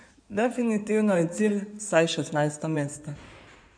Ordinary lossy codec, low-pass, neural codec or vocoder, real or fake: none; 9.9 kHz; codec, 16 kHz in and 24 kHz out, 2.2 kbps, FireRedTTS-2 codec; fake